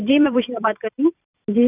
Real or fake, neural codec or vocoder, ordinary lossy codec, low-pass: fake; vocoder, 44.1 kHz, 128 mel bands every 256 samples, BigVGAN v2; none; 3.6 kHz